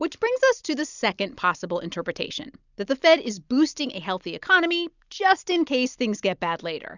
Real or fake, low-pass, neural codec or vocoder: real; 7.2 kHz; none